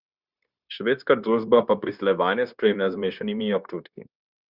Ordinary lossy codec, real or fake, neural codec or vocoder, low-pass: Opus, 64 kbps; fake; codec, 16 kHz, 0.9 kbps, LongCat-Audio-Codec; 5.4 kHz